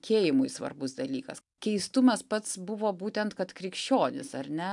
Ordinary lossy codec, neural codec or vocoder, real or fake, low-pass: MP3, 96 kbps; none; real; 10.8 kHz